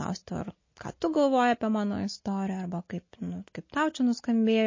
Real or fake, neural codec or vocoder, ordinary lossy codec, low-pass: real; none; MP3, 32 kbps; 7.2 kHz